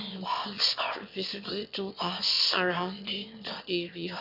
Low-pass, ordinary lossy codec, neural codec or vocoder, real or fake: 5.4 kHz; none; autoencoder, 22.05 kHz, a latent of 192 numbers a frame, VITS, trained on one speaker; fake